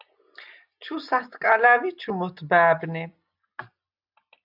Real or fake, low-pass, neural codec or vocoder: real; 5.4 kHz; none